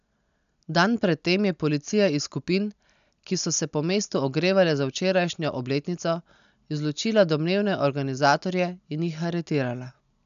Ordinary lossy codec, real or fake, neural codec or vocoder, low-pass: none; real; none; 7.2 kHz